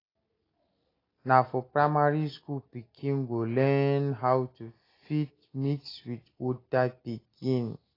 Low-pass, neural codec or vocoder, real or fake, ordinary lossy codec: 5.4 kHz; none; real; AAC, 24 kbps